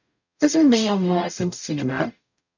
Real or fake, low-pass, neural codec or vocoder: fake; 7.2 kHz; codec, 44.1 kHz, 0.9 kbps, DAC